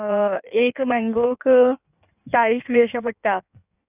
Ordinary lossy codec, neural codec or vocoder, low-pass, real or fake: none; codec, 16 kHz in and 24 kHz out, 1.1 kbps, FireRedTTS-2 codec; 3.6 kHz; fake